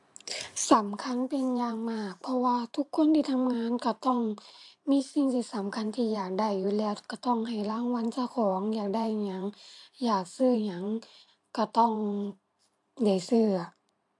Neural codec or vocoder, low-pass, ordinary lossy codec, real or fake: vocoder, 44.1 kHz, 128 mel bands every 512 samples, BigVGAN v2; 10.8 kHz; AAC, 64 kbps; fake